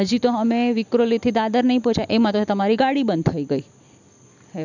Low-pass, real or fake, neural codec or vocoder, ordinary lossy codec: 7.2 kHz; real; none; none